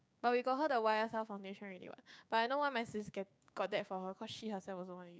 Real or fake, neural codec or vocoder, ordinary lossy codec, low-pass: fake; codec, 16 kHz, 6 kbps, DAC; none; none